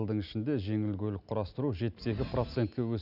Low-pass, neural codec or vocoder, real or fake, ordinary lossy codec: 5.4 kHz; none; real; none